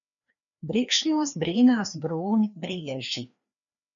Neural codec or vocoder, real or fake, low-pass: codec, 16 kHz, 2 kbps, FreqCodec, larger model; fake; 7.2 kHz